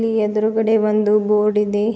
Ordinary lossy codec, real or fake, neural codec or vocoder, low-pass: none; real; none; none